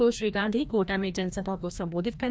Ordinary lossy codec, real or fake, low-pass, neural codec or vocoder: none; fake; none; codec, 16 kHz, 1 kbps, FreqCodec, larger model